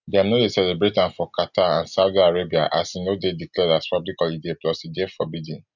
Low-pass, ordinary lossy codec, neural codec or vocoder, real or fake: 7.2 kHz; none; none; real